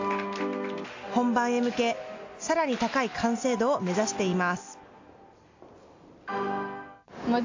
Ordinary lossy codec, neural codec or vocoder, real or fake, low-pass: AAC, 48 kbps; none; real; 7.2 kHz